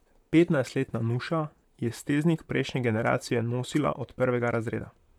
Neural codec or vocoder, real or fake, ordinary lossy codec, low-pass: vocoder, 44.1 kHz, 128 mel bands, Pupu-Vocoder; fake; none; 19.8 kHz